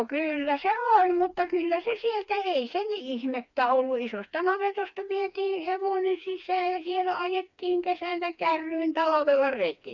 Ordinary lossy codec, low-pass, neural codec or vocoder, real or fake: none; 7.2 kHz; codec, 16 kHz, 2 kbps, FreqCodec, smaller model; fake